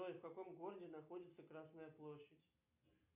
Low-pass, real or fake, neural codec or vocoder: 3.6 kHz; real; none